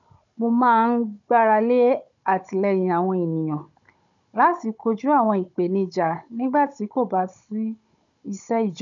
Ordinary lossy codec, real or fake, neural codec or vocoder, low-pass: none; fake; codec, 16 kHz, 16 kbps, FunCodec, trained on Chinese and English, 50 frames a second; 7.2 kHz